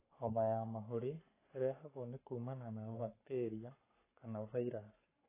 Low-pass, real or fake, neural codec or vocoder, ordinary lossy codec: 3.6 kHz; fake; codec, 44.1 kHz, 7.8 kbps, Pupu-Codec; AAC, 16 kbps